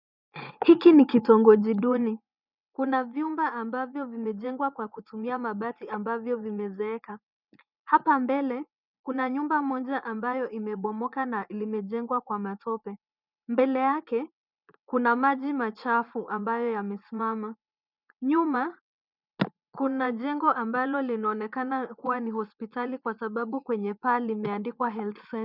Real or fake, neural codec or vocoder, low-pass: fake; vocoder, 24 kHz, 100 mel bands, Vocos; 5.4 kHz